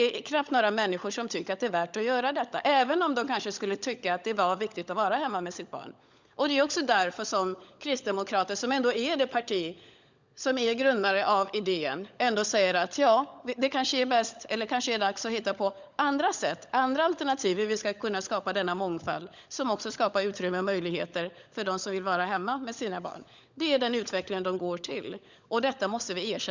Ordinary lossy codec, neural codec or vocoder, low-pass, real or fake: Opus, 64 kbps; codec, 16 kHz, 16 kbps, FunCodec, trained on LibriTTS, 50 frames a second; 7.2 kHz; fake